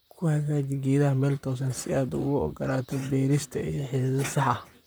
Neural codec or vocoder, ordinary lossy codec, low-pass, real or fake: vocoder, 44.1 kHz, 128 mel bands, Pupu-Vocoder; none; none; fake